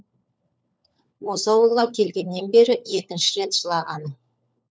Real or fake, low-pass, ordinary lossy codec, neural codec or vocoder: fake; none; none; codec, 16 kHz, 4 kbps, FunCodec, trained on LibriTTS, 50 frames a second